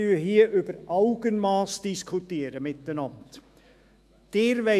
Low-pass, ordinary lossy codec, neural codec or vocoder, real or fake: 14.4 kHz; MP3, 96 kbps; autoencoder, 48 kHz, 128 numbers a frame, DAC-VAE, trained on Japanese speech; fake